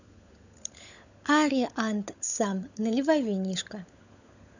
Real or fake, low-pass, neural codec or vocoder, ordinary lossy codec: fake; 7.2 kHz; codec, 16 kHz, 16 kbps, FunCodec, trained on LibriTTS, 50 frames a second; none